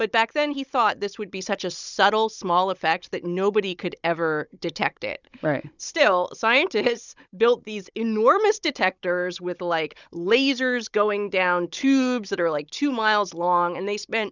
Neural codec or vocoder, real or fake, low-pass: codec, 16 kHz, 16 kbps, FunCodec, trained on Chinese and English, 50 frames a second; fake; 7.2 kHz